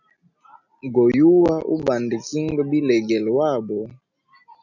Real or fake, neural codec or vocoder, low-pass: real; none; 7.2 kHz